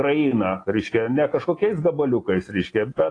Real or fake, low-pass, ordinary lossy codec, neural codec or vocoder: real; 9.9 kHz; AAC, 32 kbps; none